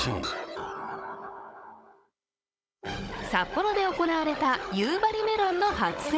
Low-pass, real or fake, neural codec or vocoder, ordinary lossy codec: none; fake; codec, 16 kHz, 16 kbps, FunCodec, trained on Chinese and English, 50 frames a second; none